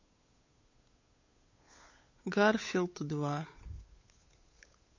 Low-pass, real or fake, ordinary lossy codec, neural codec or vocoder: 7.2 kHz; fake; MP3, 32 kbps; codec, 16 kHz, 8 kbps, FunCodec, trained on Chinese and English, 25 frames a second